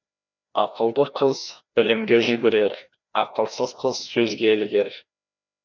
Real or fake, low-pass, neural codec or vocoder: fake; 7.2 kHz; codec, 16 kHz, 1 kbps, FreqCodec, larger model